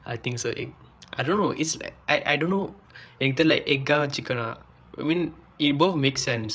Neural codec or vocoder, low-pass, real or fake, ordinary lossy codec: codec, 16 kHz, 8 kbps, FreqCodec, larger model; none; fake; none